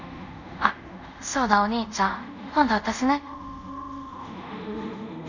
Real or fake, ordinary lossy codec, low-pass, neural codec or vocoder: fake; none; 7.2 kHz; codec, 24 kHz, 0.5 kbps, DualCodec